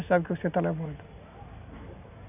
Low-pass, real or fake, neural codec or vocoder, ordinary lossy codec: 3.6 kHz; real; none; none